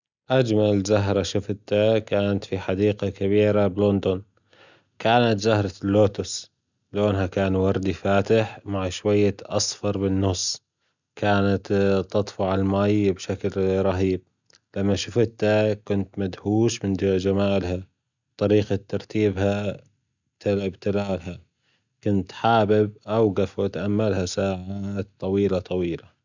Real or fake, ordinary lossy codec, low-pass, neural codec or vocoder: real; none; 7.2 kHz; none